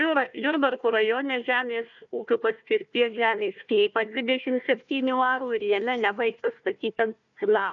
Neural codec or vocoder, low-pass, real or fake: codec, 16 kHz, 1 kbps, FunCodec, trained on Chinese and English, 50 frames a second; 7.2 kHz; fake